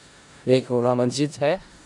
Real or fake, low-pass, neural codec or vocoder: fake; 10.8 kHz; codec, 16 kHz in and 24 kHz out, 0.4 kbps, LongCat-Audio-Codec, four codebook decoder